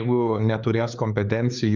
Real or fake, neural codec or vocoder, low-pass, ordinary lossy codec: fake; codec, 16 kHz, 4 kbps, X-Codec, HuBERT features, trained on LibriSpeech; 7.2 kHz; Opus, 64 kbps